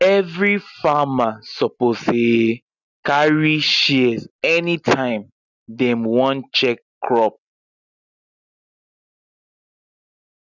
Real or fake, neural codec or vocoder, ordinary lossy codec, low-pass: real; none; none; 7.2 kHz